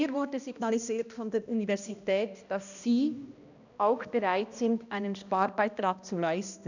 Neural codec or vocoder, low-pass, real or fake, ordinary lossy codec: codec, 16 kHz, 1 kbps, X-Codec, HuBERT features, trained on balanced general audio; 7.2 kHz; fake; none